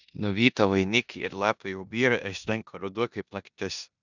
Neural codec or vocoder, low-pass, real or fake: codec, 16 kHz in and 24 kHz out, 0.9 kbps, LongCat-Audio-Codec, four codebook decoder; 7.2 kHz; fake